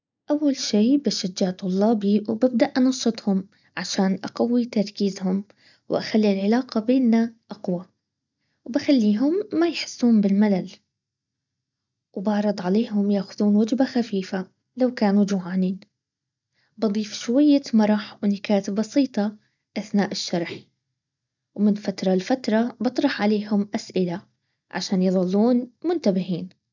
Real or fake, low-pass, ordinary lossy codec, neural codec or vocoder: real; 7.2 kHz; none; none